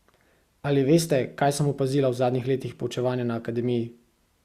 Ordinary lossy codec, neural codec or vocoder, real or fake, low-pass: Opus, 64 kbps; none; real; 14.4 kHz